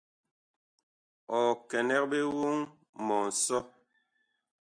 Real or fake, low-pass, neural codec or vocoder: real; 9.9 kHz; none